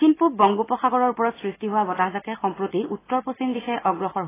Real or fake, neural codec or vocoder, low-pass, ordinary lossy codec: real; none; 3.6 kHz; AAC, 16 kbps